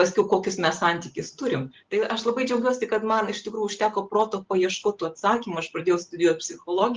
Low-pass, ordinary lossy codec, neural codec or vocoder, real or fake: 7.2 kHz; Opus, 16 kbps; none; real